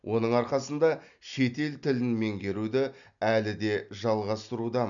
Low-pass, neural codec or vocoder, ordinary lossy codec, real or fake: 7.2 kHz; none; none; real